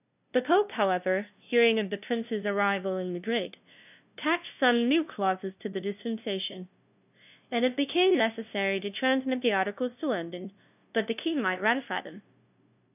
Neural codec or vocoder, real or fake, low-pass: codec, 16 kHz, 0.5 kbps, FunCodec, trained on LibriTTS, 25 frames a second; fake; 3.6 kHz